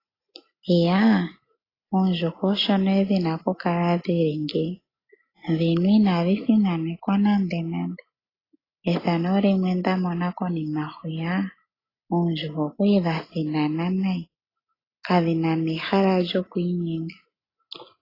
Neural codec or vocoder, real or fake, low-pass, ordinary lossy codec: none; real; 5.4 kHz; AAC, 24 kbps